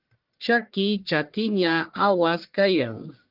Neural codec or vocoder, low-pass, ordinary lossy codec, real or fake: codec, 44.1 kHz, 1.7 kbps, Pupu-Codec; 5.4 kHz; Opus, 24 kbps; fake